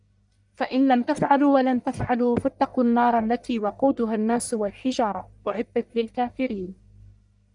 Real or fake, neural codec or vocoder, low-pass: fake; codec, 44.1 kHz, 1.7 kbps, Pupu-Codec; 10.8 kHz